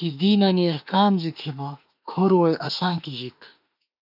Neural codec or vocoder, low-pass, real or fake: autoencoder, 48 kHz, 32 numbers a frame, DAC-VAE, trained on Japanese speech; 5.4 kHz; fake